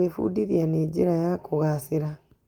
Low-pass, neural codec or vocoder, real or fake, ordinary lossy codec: 19.8 kHz; none; real; Opus, 24 kbps